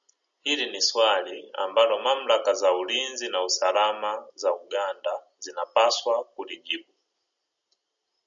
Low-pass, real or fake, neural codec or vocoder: 7.2 kHz; real; none